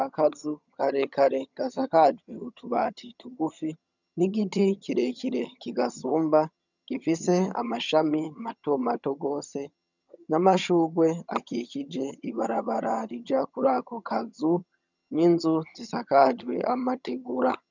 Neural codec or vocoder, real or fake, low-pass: vocoder, 22.05 kHz, 80 mel bands, HiFi-GAN; fake; 7.2 kHz